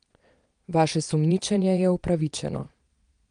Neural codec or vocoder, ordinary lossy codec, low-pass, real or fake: vocoder, 22.05 kHz, 80 mel bands, Vocos; Opus, 32 kbps; 9.9 kHz; fake